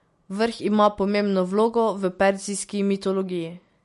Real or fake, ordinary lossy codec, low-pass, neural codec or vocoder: real; MP3, 48 kbps; 14.4 kHz; none